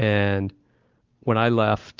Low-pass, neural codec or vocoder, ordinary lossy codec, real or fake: 7.2 kHz; none; Opus, 32 kbps; real